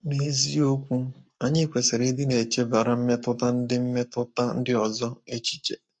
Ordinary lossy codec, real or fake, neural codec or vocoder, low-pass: MP3, 48 kbps; fake; codec, 44.1 kHz, 7.8 kbps, Pupu-Codec; 9.9 kHz